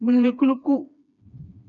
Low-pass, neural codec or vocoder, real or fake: 7.2 kHz; codec, 16 kHz, 2 kbps, FreqCodec, smaller model; fake